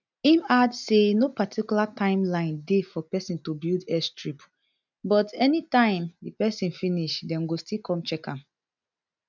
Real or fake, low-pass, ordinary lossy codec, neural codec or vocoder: real; 7.2 kHz; none; none